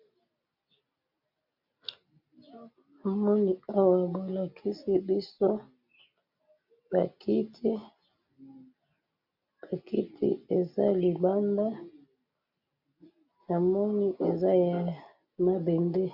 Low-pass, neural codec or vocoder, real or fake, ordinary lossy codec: 5.4 kHz; none; real; MP3, 48 kbps